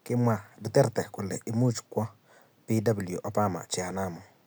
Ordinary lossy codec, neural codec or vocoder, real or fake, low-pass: none; none; real; none